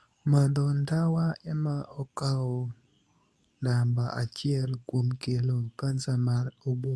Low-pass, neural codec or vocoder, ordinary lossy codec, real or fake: none; codec, 24 kHz, 0.9 kbps, WavTokenizer, medium speech release version 2; none; fake